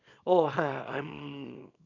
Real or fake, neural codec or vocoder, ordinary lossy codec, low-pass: fake; codec, 24 kHz, 0.9 kbps, WavTokenizer, small release; none; 7.2 kHz